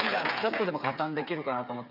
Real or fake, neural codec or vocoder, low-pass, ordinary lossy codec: fake; codec, 16 kHz, 8 kbps, FreqCodec, smaller model; 5.4 kHz; none